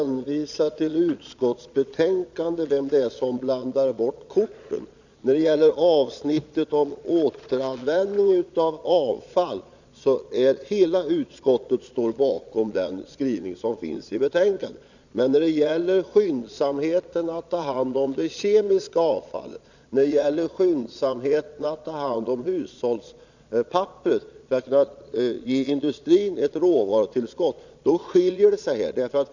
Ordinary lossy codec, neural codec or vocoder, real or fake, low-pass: none; vocoder, 22.05 kHz, 80 mel bands, Vocos; fake; 7.2 kHz